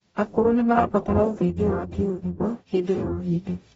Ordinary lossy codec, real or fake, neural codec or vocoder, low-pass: AAC, 24 kbps; fake; codec, 44.1 kHz, 0.9 kbps, DAC; 19.8 kHz